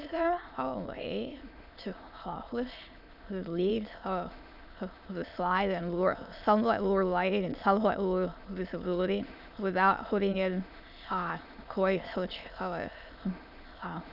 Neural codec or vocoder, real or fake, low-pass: autoencoder, 22.05 kHz, a latent of 192 numbers a frame, VITS, trained on many speakers; fake; 5.4 kHz